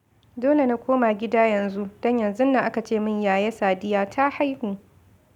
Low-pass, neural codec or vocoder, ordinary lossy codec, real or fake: 19.8 kHz; none; none; real